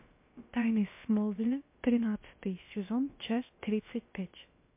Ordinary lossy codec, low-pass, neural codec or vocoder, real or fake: MP3, 24 kbps; 3.6 kHz; codec, 16 kHz, about 1 kbps, DyCAST, with the encoder's durations; fake